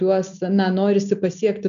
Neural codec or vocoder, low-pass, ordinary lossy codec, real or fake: none; 7.2 kHz; MP3, 96 kbps; real